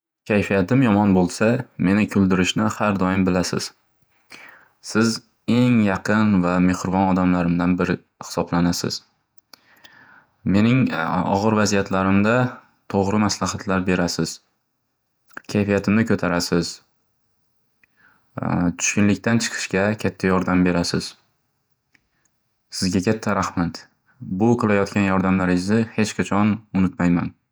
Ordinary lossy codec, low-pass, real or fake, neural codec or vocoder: none; none; fake; vocoder, 48 kHz, 128 mel bands, Vocos